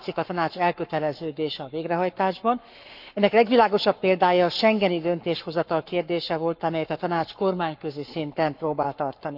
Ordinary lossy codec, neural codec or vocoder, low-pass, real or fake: none; codec, 44.1 kHz, 7.8 kbps, Pupu-Codec; 5.4 kHz; fake